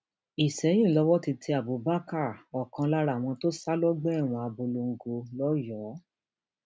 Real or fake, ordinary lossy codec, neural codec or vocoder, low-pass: real; none; none; none